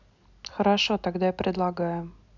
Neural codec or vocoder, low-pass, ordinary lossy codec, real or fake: none; 7.2 kHz; none; real